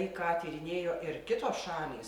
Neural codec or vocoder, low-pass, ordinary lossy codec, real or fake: none; 19.8 kHz; Opus, 64 kbps; real